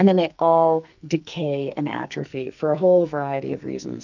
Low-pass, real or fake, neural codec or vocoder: 7.2 kHz; fake; codec, 44.1 kHz, 2.6 kbps, SNAC